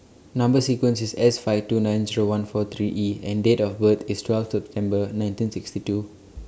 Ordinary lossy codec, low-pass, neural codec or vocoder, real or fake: none; none; none; real